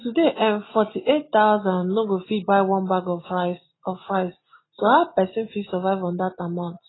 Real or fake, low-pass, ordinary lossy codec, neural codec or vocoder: real; 7.2 kHz; AAC, 16 kbps; none